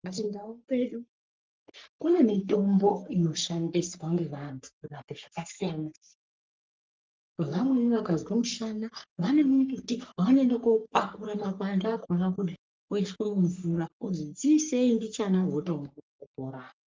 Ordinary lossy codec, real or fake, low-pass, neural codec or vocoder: Opus, 24 kbps; fake; 7.2 kHz; codec, 44.1 kHz, 3.4 kbps, Pupu-Codec